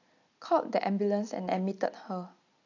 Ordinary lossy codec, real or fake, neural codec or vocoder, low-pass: AAC, 48 kbps; real; none; 7.2 kHz